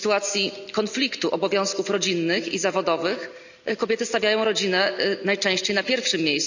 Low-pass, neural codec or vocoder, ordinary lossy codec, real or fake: 7.2 kHz; none; none; real